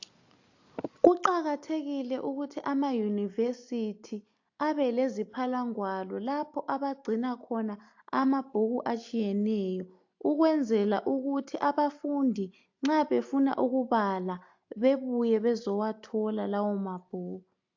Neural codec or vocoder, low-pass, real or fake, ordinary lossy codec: none; 7.2 kHz; real; AAC, 48 kbps